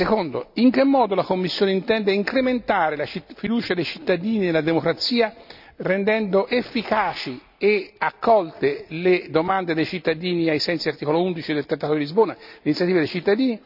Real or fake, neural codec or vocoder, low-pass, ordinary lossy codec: real; none; 5.4 kHz; none